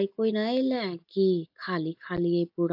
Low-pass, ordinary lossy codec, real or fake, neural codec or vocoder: 5.4 kHz; AAC, 48 kbps; real; none